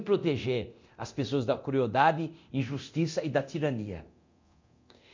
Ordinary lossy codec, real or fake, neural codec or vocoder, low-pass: MP3, 48 kbps; fake; codec, 24 kHz, 0.9 kbps, DualCodec; 7.2 kHz